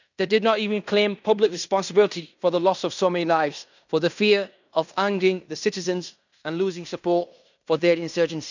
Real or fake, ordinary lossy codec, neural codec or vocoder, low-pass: fake; none; codec, 16 kHz in and 24 kHz out, 0.9 kbps, LongCat-Audio-Codec, fine tuned four codebook decoder; 7.2 kHz